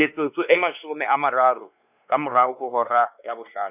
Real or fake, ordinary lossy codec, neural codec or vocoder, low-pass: fake; none; codec, 16 kHz, 2 kbps, X-Codec, WavLM features, trained on Multilingual LibriSpeech; 3.6 kHz